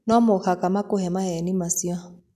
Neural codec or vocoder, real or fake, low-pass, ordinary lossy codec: none; real; 14.4 kHz; none